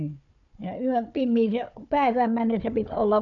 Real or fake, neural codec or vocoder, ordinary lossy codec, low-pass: fake; codec, 16 kHz, 4 kbps, FunCodec, trained on Chinese and English, 50 frames a second; MP3, 96 kbps; 7.2 kHz